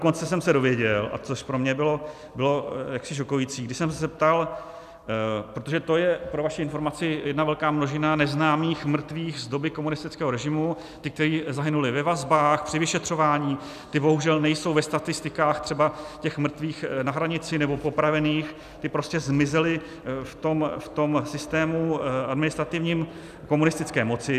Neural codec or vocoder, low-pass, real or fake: none; 14.4 kHz; real